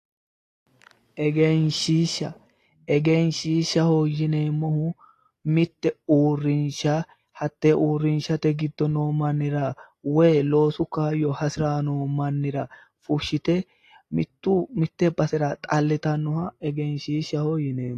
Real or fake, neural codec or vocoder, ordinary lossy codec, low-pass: real; none; AAC, 48 kbps; 14.4 kHz